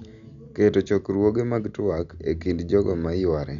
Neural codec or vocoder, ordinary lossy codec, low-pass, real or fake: none; none; 7.2 kHz; real